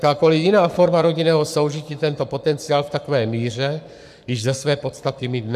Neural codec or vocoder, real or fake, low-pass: codec, 44.1 kHz, 7.8 kbps, Pupu-Codec; fake; 14.4 kHz